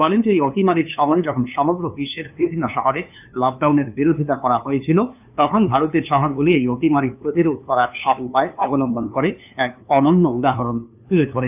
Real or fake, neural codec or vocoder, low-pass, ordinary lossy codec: fake; codec, 16 kHz, 2 kbps, FunCodec, trained on LibriTTS, 25 frames a second; 3.6 kHz; none